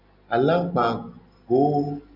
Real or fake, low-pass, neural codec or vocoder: real; 5.4 kHz; none